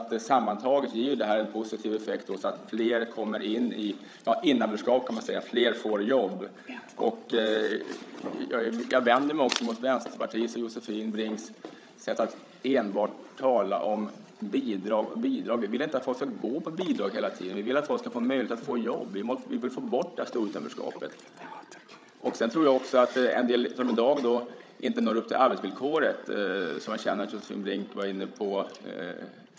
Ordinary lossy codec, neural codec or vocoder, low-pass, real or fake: none; codec, 16 kHz, 16 kbps, FreqCodec, larger model; none; fake